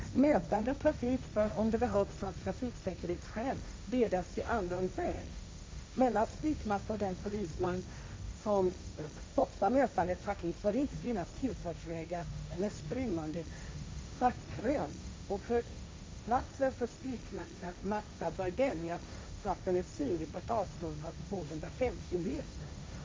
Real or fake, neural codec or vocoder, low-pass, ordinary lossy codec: fake; codec, 16 kHz, 1.1 kbps, Voila-Tokenizer; none; none